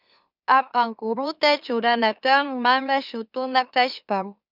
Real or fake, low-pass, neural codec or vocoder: fake; 5.4 kHz; autoencoder, 44.1 kHz, a latent of 192 numbers a frame, MeloTTS